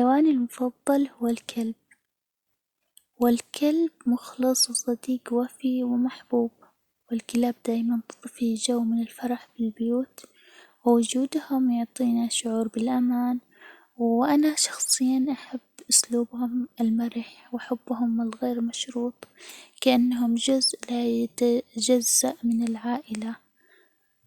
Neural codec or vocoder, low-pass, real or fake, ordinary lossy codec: none; 19.8 kHz; real; Opus, 64 kbps